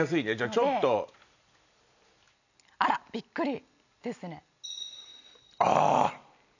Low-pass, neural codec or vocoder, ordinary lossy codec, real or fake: 7.2 kHz; none; none; real